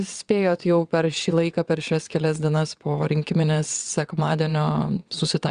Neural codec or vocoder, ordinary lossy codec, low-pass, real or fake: vocoder, 22.05 kHz, 80 mel bands, WaveNeXt; Opus, 64 kbps; 9.9 kHz; fake